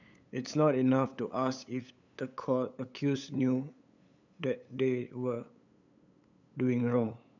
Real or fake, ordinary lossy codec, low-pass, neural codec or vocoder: fake; none; 7.2 kHz; codec, 16 kHz, 8 kbps, FunCodec, trained on LibriTTS, 25 frames a second